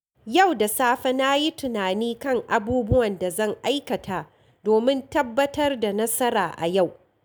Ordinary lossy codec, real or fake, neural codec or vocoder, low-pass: none; real; none; none